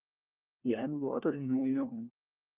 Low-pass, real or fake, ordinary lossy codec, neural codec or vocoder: 3.6 kHz; fake; Opus, 32 kbps; codec, 16 kHz, 1 kbps, FunCodec, trained on LibriTTS, 50 frames a second